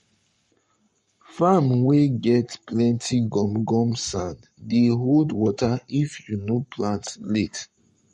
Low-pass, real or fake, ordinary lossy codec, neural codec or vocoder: 19.8 kHz; fake; MP3, 48 kbps; codec, 44.1 kHz, 7.8 kbps, Pupu-Codec